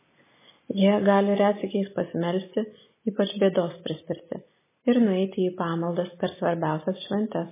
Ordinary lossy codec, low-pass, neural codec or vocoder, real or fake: MP3, 16 kbps; 3.6 kHz; none; real